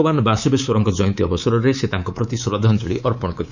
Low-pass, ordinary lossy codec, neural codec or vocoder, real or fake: 7.2 kHz; none; codec, 24 kHz, 3.1 kbps, DualCodec; fake